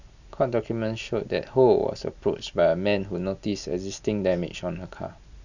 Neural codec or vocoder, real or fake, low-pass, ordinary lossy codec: none; real; 7.2 kHz; none